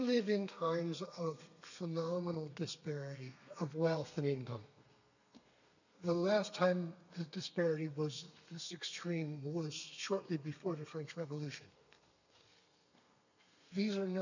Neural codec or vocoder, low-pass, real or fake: codec, 32 kHz, 1.9 kbps, SNAC; 7.2 kHz; fake